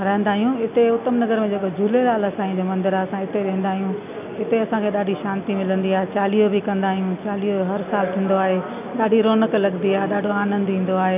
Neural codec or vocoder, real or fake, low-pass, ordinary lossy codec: none; real; 3.6 kHz; none